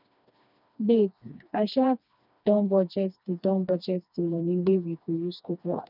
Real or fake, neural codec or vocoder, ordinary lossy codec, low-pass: fake; codec, 16 kHz, 2 kbps, FreqCodec, smaller model; none; 5.4 kHz